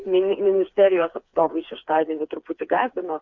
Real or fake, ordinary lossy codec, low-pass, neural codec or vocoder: fake; Opus, 64 kbps; 7.2 kHz; codec, 16 kHz, 4 kbps, FreqCodec, smaller model